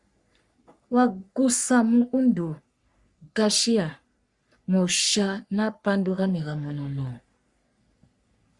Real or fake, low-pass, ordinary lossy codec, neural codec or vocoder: fake; 10.8 kHz; Opus, 64 kbps; codec, 44.1 kHz, 3.4 kbps, Pupu-Codec